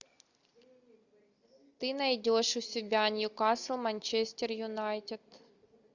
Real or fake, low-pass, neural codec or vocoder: real; 7.2 kHz; none